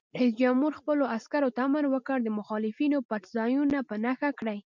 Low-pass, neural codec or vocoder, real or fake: 7.2 kHz; none; real